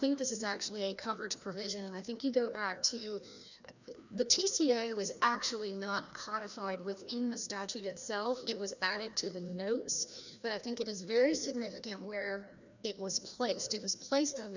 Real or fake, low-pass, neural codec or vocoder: fake; 7.2 kHz; codec, 16 kHz, 1 kbps, FreqCodec, larger model